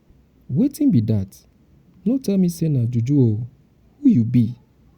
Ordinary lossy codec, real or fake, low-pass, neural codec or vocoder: Opus, 64 kbps; real; 19.8 kHz; none